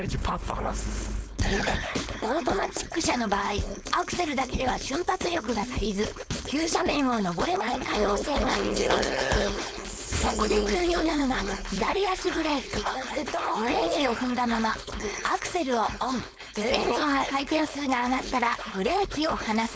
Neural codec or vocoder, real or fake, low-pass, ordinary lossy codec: codec, 16 kHz, 4.8 kbps, FACodec; fake; none; none